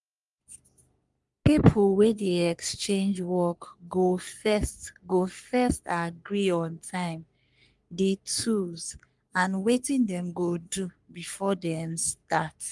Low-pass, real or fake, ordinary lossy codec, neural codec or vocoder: 10.8 kHz; fake; Opus, 32 kbps; codec, 44.1 kHz, 3.4 kbps, Pupu-Codec